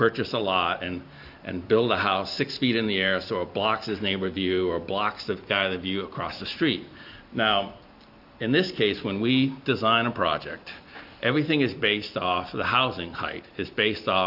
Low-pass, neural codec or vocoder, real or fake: 5.4 kHz; none; real